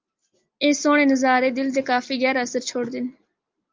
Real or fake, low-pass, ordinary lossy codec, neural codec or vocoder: real; 7.2 kHz; Opus, 32 kbps; none